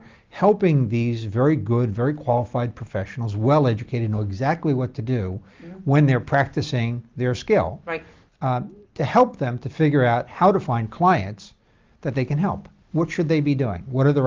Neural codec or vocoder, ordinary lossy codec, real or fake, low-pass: none; Opus, 32 kbps; real; 7.2 kHz